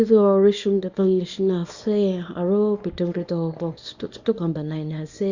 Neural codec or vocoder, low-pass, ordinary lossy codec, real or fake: codec, 24 kHz, 0.9 kbps, WavTokenizer, small release; 7.2 kHz; Opus, 64 kbps; fake